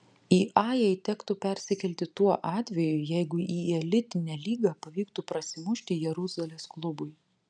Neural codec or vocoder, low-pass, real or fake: none; 9.9 kHz; real